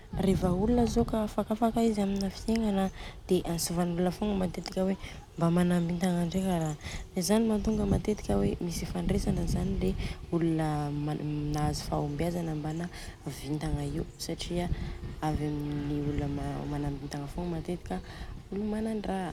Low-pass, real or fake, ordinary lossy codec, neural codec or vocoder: 19.8 kHz; real; none; none